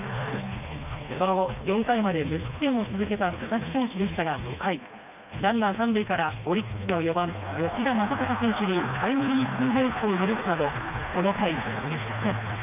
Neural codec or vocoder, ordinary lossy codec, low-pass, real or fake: codec, 16 kHz, 2 kbps, FreqCodec, smaller model; none; 3.6 kHz; fake